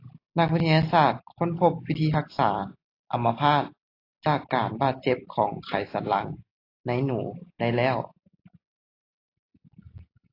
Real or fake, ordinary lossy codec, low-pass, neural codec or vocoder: real; AAC, 32 kbps; 5.4 kHz; none